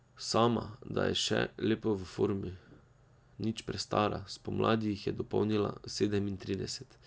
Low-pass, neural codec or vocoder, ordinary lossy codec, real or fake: none; none; none; real